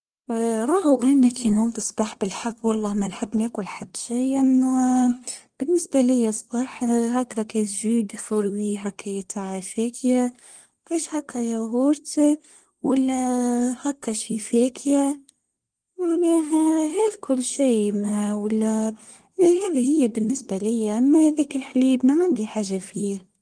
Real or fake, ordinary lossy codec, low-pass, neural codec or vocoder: fake; Opus, 24 kbps; 9.9 kHz; codec, 24 kHz, 1 kbps, SNAC